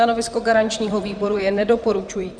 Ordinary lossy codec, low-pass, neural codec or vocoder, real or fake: Opus, 64 kbps; 9.9 kHz; vocoder, 44.1 kHz, 128 mel bands, Pupu-Vocoder; fake